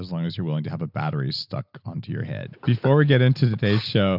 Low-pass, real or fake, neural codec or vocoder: 5.4 kHz; real; none